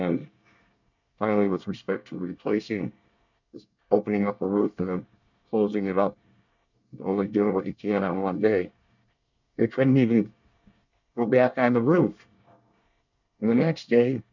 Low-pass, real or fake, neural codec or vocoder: 7.2 kHz; fake; codec, 24 kHz, 1 kbps, SNAC